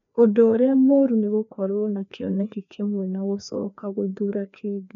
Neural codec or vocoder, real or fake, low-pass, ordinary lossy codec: codec, 16 kHz, 2 kbps, FreqCodec, larger model; fake; 7.2 kHz; none